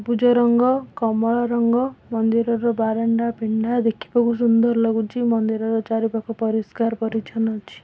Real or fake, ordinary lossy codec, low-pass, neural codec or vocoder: real; none; none; none